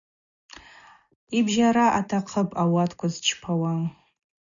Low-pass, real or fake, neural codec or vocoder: 7.2 kHz; real; none